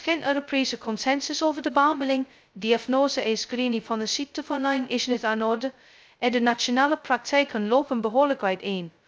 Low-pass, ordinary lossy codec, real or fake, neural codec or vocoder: none; none; fake; codec, 16 kHz, 0.2 kbps, FocalCodec